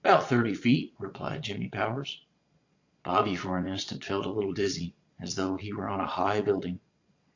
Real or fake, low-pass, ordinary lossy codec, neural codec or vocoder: fake; 7.2 kHz; AAC, 48 kbps; vocoder, 22.05 kHz, 80 mel bands, Vocos